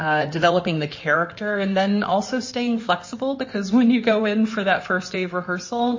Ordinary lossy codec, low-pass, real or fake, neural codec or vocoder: MP3, 32 kbps; 7.2 kHz; fake; codec, 16 kHz in and 24 kHz out, 2.2 kbps, FireRedTTS-2 codec